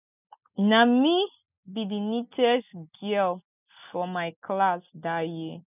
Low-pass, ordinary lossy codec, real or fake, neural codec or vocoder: 3.6 kHz; none; real; none